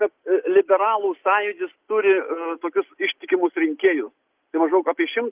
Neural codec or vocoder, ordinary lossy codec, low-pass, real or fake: none; Opus, 24 kbps; 3.6 kHz; real